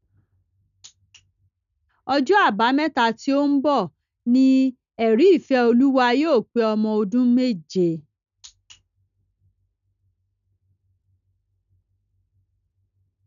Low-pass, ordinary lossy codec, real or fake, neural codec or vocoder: 7.2 kHz; none; real; none